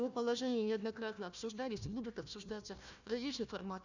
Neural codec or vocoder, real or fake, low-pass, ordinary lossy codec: codec, 16 kHz, 1 kbps, FunCodec, trained on Chinese and English, 50 frames a second; fake; 7.2 kHz; none